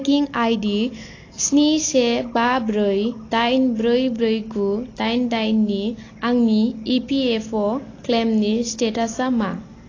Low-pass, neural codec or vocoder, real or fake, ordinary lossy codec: 7.2 kHz; none; real; AAC, 32 kbps